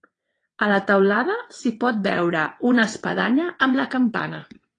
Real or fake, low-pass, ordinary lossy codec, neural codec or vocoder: fake; 9.9 kHz; AAC, 32 kbps; vocoder, 22.05 kHz, 80 mel bands, WaveNeXt